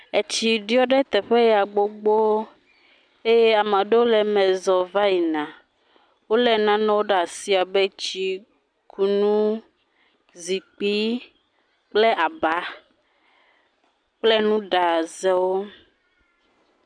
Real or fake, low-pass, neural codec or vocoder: real; 9.9 kHz; none